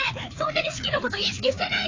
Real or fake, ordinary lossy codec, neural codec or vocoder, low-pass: fake; AAC, 32 kbps; codec, 16 kHz, 2 kbps, FreqCodec, larger model; 7.2 kHz